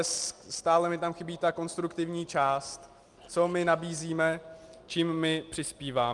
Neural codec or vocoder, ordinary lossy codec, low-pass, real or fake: none; Opus, 32 kbps; 10.8 kHz; real